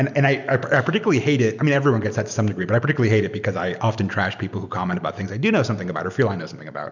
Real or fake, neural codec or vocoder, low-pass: real; none; 7.2 kHz